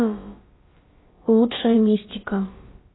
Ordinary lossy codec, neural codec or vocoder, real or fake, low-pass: AAC, 16 kbps; codec, 16 kHz, about 1 kbps, DyCAST, with the encoder's durations; fake; 7.2 kHz